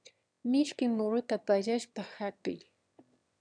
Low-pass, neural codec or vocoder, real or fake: 9.9 kHz; autoencoder, 22.05 kHz, a latent of 192 numbers a frame, VITS, trained on one speaker; fake